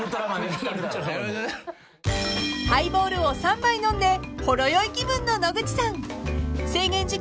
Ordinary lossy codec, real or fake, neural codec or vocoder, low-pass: none; real; none; none